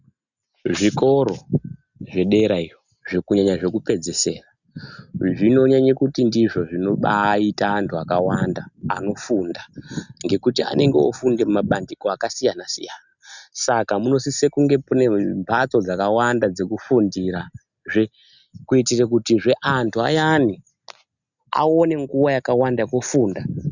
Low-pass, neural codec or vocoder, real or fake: 7.2 kHz; none; real